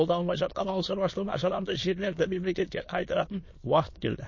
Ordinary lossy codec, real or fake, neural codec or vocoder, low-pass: MP3, 32 kbps; fake; autoencoder, 22.05 kHz, a latent of 192 numbers a frame, VITS, trained on many speakers; 7.2 kHz